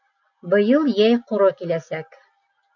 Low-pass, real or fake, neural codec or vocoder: 7.2 kHz; real; none